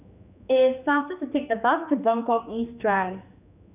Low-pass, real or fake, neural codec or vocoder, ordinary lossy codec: 3.6 kHz; fake; codec, 16 kHz, 2 kbps, X-Codec, HuBERT features, trained on general audio; none